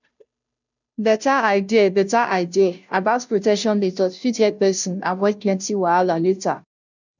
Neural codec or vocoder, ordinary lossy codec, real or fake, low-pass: codec, 16 kHz, 0.5 kbps, FunCodec, trained on Chinese and English, 25 frames a second; none; fake; 7.2 kHz